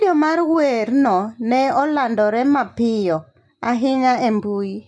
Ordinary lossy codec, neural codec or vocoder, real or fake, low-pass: none; none; real; 10.8 kHz